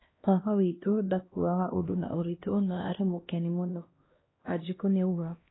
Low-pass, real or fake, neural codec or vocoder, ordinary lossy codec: 7.2 kHz; fake; codec, 16 kHz, 1 kbps, X-Codec, HuBERT features, trained on LibriSpeech; AAC, 16 kbps